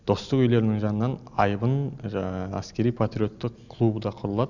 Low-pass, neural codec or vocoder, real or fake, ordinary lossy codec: 7.2 kHz; none; real; none